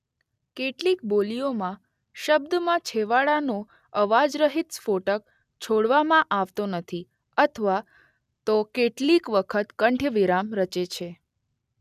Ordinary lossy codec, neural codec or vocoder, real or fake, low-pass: none; none; real; 14.4 kHz